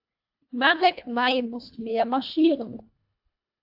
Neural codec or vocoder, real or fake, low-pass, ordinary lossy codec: codec, 24 kHz, 1.5 kbps, HILCodec; fake; 5.4 kHz; AAC, 48 kbps